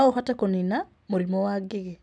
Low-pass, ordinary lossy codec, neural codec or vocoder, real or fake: none; none; none; real